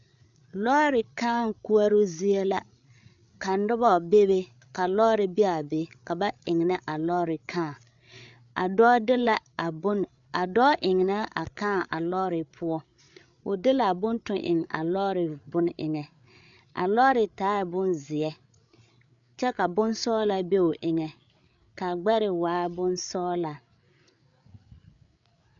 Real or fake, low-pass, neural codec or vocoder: fake; 7.2 kHz; codec, 16 kHz, 8 kbps, FreqCodec, larger model